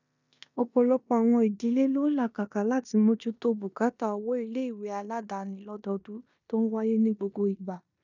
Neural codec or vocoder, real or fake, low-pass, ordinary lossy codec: codec, 16 kHz in and 24 kHz out, 0.9 kbps, LongCat-Audio-Codec, four codebook decoder; fake; 7.2 kHz; none